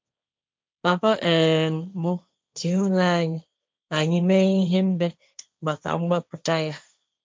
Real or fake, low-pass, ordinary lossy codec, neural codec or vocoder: fake; none; none; codec, 16 kHz, 1.1 kbps, Voila-Tokenizer